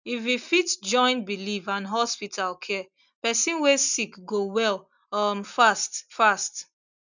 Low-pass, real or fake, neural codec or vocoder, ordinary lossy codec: 7.2 kHz; real; none; none